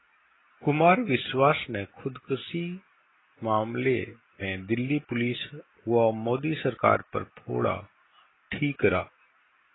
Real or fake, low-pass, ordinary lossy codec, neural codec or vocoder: real; 7.2 kHz; AAC, 16 kbps; none